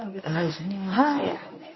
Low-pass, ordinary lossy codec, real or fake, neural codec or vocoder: 7.2 kHz; MP3, 24 kbps; fake; codec, 24 kHz, 0.9 kbps, WavTokenizer, medium speech release version 1